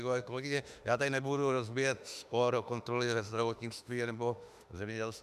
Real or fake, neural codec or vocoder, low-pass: fake; autoencoder, 48 kHz, 32 numbers a frame, DAC-VAE, trained on Japanese speech; 14.4 kHz